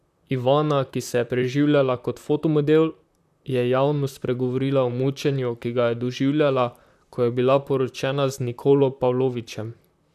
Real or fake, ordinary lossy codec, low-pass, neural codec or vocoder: fake; none; 14.4 kHz; vocoder, 44.1 kHz, 128 mel bands, Pupu-Vocoder